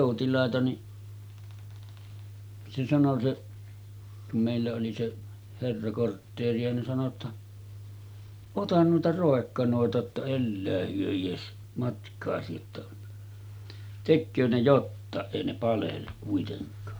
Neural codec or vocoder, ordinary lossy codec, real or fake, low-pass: none; none; real; none